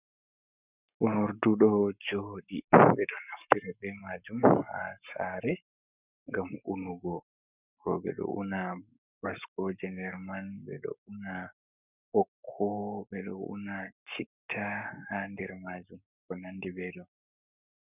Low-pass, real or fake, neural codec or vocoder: 3.6 kHz; fake; codec, 44.1 kHz, 7.8 kbps, Pupu-Codec